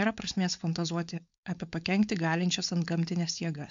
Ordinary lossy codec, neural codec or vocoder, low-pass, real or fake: MP3, 64 kbps; codec, 16 kHz, 4.8 kbps, FACodec; 7.2 kHz; fake